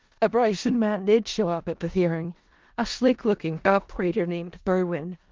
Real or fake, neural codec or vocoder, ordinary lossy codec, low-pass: fake; codec, 16 kHz in and 24 kHz out, 0.4 kbps, LongCat-Audio-Codec, four codebook decoder; Opus, 32 kbps; 7.2 kHz